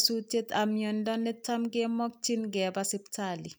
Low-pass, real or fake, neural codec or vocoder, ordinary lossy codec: none; real; none; none